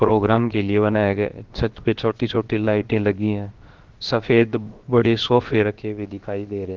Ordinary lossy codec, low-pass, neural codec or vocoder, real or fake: Opus, 16 kbps; 7.2 kHz; codec, 16 kHz, about 1 kbps, DyCAST, with the encoder's durations; fake